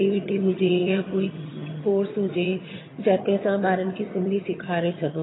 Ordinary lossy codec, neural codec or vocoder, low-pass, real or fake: AAC, 16 kbps; vocoder, 22.05 kHz, 80 mel bands, HiFi-GAN; 7.2 kHz; fake